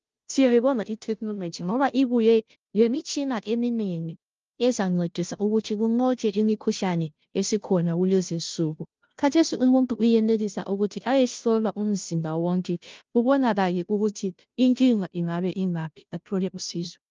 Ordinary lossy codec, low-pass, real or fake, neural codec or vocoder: Opus, 24 kbps; 7.2 kHz; fake; codec, 16 kHz, 0.5 kbps, FunCodec, trained on Chinese and English, 25 frames a second